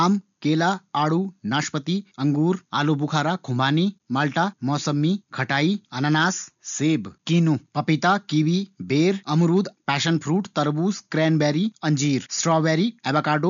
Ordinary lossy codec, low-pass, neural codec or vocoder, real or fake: none; 7.2 kHz; none; real